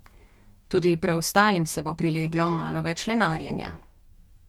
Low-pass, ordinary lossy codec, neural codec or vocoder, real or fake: 19.8 kHz; MP3, 96 kbps; codec, 44.1 kHz, 2.6 kbps, DAC; fake